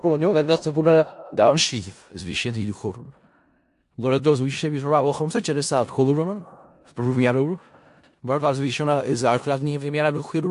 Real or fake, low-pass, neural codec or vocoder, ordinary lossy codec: fake; 10.8 kHz; codec, 16 kHz in and 24 kHz out, 0.4 kbps, LongCat-Audio-Codec, four codebook decoder; AAC, 64 kbps